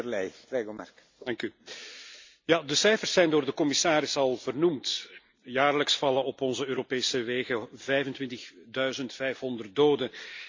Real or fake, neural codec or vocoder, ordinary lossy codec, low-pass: real; none; MP3, 64 kbps; 7.2 kHz